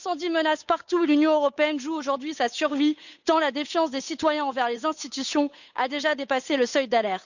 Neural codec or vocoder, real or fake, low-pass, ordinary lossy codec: codec, 16 kHz, 8 kbps, FunCodec, trained on Chinese and English, 25 frames a second; fake; 7.2 kHz; none